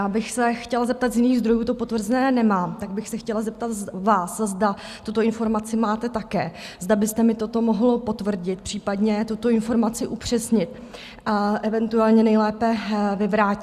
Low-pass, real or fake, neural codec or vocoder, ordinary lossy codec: 14.4 kHz; real; none; Opus, 64 kbps